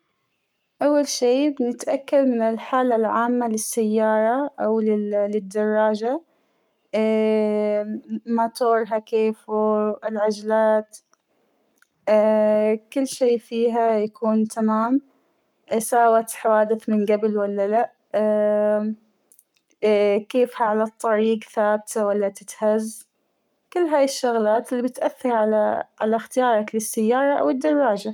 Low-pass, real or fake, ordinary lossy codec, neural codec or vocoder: 19.8 kHz; fake; none; codec, 44.1 kHz, 7.8 kbps, Pupu-Codec